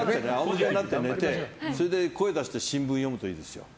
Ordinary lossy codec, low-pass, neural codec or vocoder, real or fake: none; none; none; real